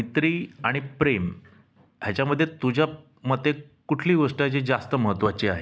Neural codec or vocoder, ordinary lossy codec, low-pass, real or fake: none; none; none; real